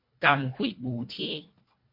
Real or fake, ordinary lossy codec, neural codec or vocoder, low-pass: fake; MP3, 32 kbps; codec, 24 kHz, 1.5 kbps, HILCodec; 5.4 kHz